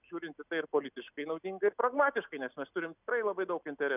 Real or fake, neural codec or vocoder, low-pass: real; none; 3.6 kHz